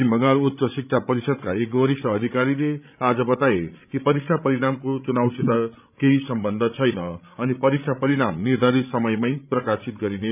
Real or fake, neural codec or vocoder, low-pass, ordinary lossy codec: fake; codec, 16 kHz, 16 kbps, FreqCodec, larger model; 3.6 kHz; none